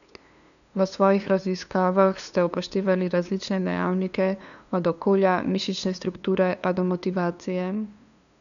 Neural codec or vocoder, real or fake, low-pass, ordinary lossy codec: codec, 16 kHz, 2 kbps, FunCodec, trained on LibriTTS, 25 frames a second; fake; 7.2 kHz; none